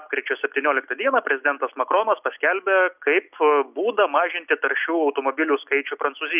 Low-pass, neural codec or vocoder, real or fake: 3.6 kHz; none; real